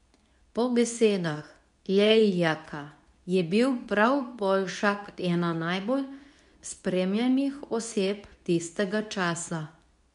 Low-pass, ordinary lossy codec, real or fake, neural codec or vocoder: 10.8 kHz; none; fake; codec, 24 kHz, 0.9 kbps, WavTokenizer, medium speech release version 2